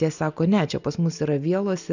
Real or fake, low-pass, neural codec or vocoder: real; 7.2 kHz; none